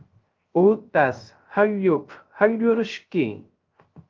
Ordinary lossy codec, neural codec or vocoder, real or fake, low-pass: Opus, 32 kbps; codec, 16 kHz, 0.3 kbps, FocalCodec; fake; 7.2 kHz